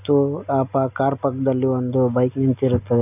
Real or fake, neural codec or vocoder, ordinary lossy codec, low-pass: real; none; none; 3.6 kHz